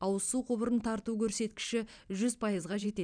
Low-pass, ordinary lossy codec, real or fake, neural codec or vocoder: 9.9 kHz; none; fake; vocoder, 44.1 kHz, 128 mel bands every 512 samples, BigVGAN v2